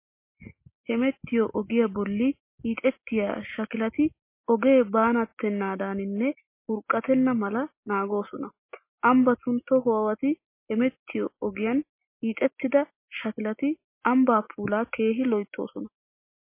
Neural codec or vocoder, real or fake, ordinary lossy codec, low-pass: none; real; MP3, 24 kbps; 3.6 kHz